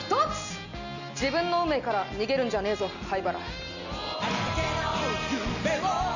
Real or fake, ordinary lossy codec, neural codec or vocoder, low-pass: real; none; none; 7.2 kHz